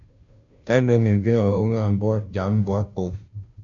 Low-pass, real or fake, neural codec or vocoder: 7.2 kHz; fake; codec, 16 kHz, 0.5 kbps, FunCodec, trained on Chinese and English, 25 frames a second